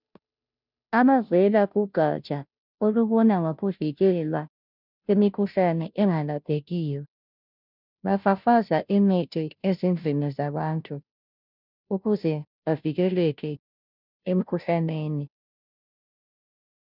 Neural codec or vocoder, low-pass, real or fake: codec, 16 kHz, 0.5 kbps, FunCodec, trained on Chinese and English, 25 frames a second; 5.4 kHz; fake